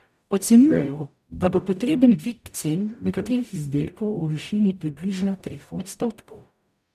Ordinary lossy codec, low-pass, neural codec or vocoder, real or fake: none; 14.4 kHz; codec, 44.1 kHz, 0.9 kbps, DAC; fake